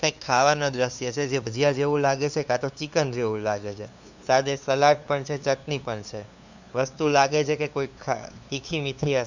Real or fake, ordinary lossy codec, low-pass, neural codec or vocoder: fake; Opus, 64 kbps; 7.2 kHz; codec, 16 kHz, 2 kbps, FunCodec, trained on LibriTTS, 25 frames a second